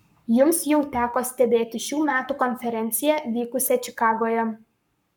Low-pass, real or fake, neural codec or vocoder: 19.8 kHz; fake; codec, 44.1 kHz, 7.8 kbps, Pupu-Codec